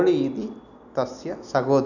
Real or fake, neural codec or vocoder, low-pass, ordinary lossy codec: real; none; 7.2 kHz; none